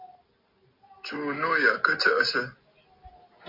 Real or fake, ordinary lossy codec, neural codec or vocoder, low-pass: real; MP3, 32 kbps; none; 5.4 kHz